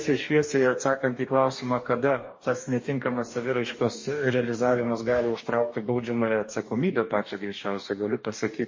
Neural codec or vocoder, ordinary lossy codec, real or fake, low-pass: codec, 44.1 kHz, 2.6 kbps, DAC; MP3, 32 kbps; fake; 7.2 kHz